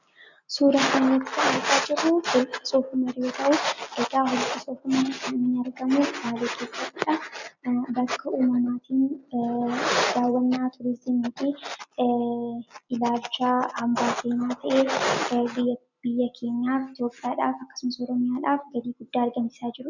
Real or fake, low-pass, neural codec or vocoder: real; 7.2 kHz; none